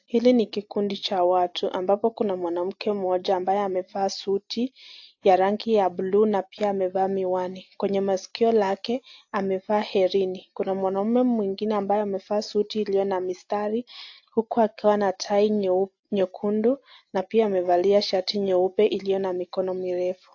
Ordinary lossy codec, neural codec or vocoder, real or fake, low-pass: AAC, 48 kbps; none; real; 7.2 kHz